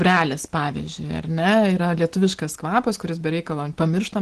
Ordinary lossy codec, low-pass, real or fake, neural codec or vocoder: Opus, 16 kbps; 10.8 kHz; real; none